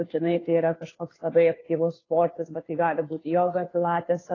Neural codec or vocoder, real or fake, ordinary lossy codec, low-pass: codec, 16 kHz, 2 kbps, FunCodec, trained on Chinese and English, 25 frames a second; fake; AAC, 32 kbps; 7.2 kHz